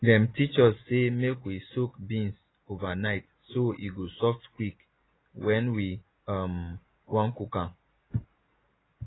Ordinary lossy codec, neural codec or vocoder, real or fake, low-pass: AAC, 16 kbps; none; real; 7.2 kHz